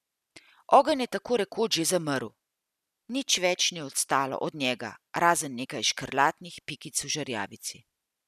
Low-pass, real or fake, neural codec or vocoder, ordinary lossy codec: 14.4 kHz; real; none; none